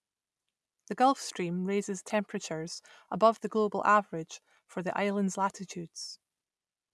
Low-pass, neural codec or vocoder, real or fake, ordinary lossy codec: none; none; real; none